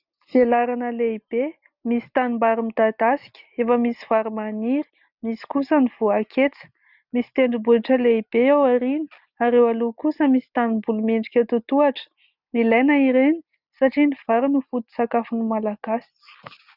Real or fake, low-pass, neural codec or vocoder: real; 5.4 kHz; none